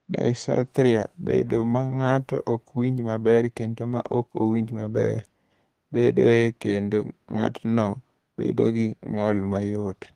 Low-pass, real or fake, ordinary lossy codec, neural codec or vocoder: 14.4 kHz; fake; Opus, 24 kbps; codec, 32 kHz, 1.9 kbps, SNAC